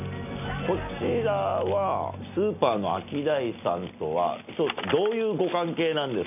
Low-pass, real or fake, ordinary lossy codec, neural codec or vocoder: 3.6 kHz; real; none; none